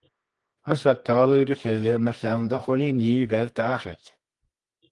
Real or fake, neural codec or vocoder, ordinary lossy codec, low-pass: fake; codec, 24 kHz, 0.9 kbps, WavTokenizer, medium music audio release; Opus, 24 kbps; 10.8 kHz